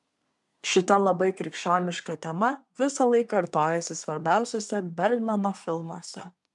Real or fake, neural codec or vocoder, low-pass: fake; codec, 24 kHz, 1 kbps, SNAC; 10.8 kHz